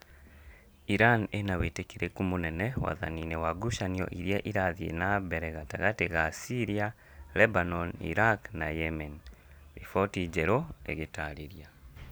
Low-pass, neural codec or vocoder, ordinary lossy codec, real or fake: none; none; none; real